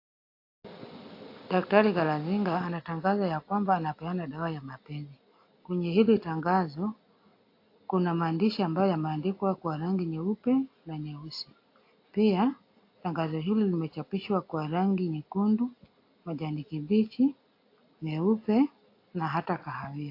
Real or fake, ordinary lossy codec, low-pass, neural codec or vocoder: real; AAC, 32 kbps; 5.4 kHz; none